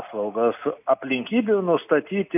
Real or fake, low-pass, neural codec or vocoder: real; 3.6 kHz; none